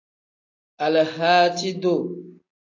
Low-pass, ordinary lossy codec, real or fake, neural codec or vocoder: 7.2 kHz; AAC, 48 kbps; real; none